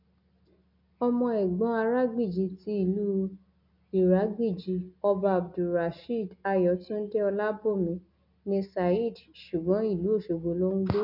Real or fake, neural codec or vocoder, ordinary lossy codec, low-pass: real; none; none; 5.4 kHz